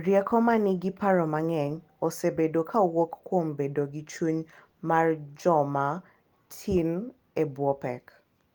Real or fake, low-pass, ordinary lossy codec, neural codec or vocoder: real; 19.8 kHz; Opus, 24 kbps; none